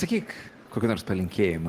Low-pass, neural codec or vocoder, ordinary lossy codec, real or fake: 14.4 kHz; none; Opus, 16 kbps; real